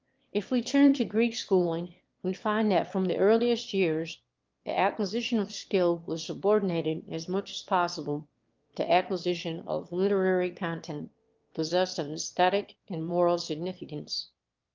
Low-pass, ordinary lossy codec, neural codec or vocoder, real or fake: 7.2 kHz; Opus, 32 kbps; autoencoder, 22.05 kHz, a latent of 192 numbers a frame, VITS, trained on one speaker; fake